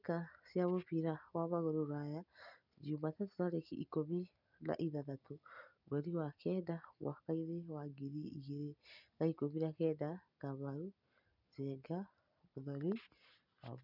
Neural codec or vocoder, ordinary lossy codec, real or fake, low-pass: none; none; real; 5.4 kHz